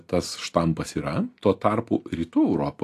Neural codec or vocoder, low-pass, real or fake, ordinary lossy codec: none; 14.4 kHz; real; AAC, 64 kbps